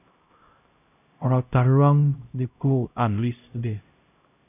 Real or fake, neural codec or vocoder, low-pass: fake; codec, 16 kHz, 0.5 kbps, X-Codec, HuBERT features, trained on LibriSpeech; 3.6 kHz